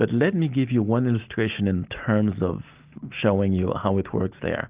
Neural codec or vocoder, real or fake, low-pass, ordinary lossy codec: none; real; 3.6 kHz; Opus, 32 kbps